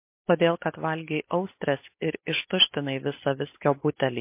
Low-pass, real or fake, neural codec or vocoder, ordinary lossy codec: 3.6 kHz; real; none; MP3, 24 kbps